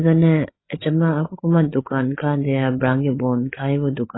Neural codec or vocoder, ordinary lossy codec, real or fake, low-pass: codec, 16 kHz, 8 kbps, FreqCodec, larger model; AAC, 16 kbps; fake; 7.2 kHz